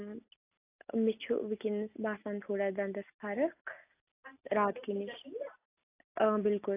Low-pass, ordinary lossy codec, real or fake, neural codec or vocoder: 3.6 kHz; AAC, 24 kbps; real; none